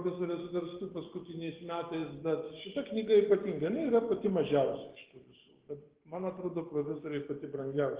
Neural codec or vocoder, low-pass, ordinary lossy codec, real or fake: codec, 44.1 kHz, 7.8 kbps, DAC; 3.6 kHz; Opus, 16 kbps; fake